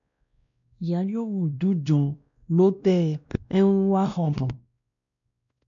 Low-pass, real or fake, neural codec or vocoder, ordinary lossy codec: 7.2 kHz; fake; codec, 16 kHz, 1 kbps, X-Codec, WavLM features, trained on Multilingual LibriSpeech; MP3, 96 kbps